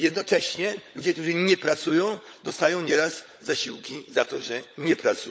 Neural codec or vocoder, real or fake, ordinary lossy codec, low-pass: codec, 16 kHz, 16 kbps, FunCodec, trained on LibriTTS, 50 frames a second; fake; none; none